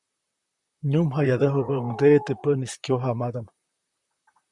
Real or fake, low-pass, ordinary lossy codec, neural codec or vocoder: fake; 10.8 kHz; Opus, 64 kbps; vocoder, 44.1 kHz, 128 mel bands, Pupu-Vocoder